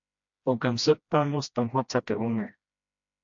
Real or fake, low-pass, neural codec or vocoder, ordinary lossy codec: fake; 7.2 kHz; codec, 16 kHz, 1 kbps, FreqCodec, smaller model; MP3, 48 kbps